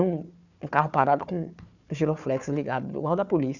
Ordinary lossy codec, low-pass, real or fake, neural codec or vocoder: none; 7.2 kHz; fake; codec, 16 kHz, 4 kbps, FunCodec, trained on Chinese and English, 50 frames a second